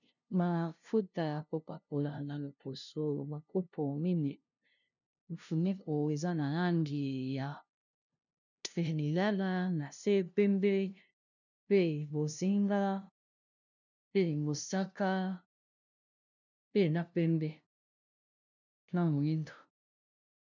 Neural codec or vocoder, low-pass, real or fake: codec, 16 kHz, 0.5 kbps, FunCodec, trained on LibriTTS, 25 frames a second; 7.2 kHz; fake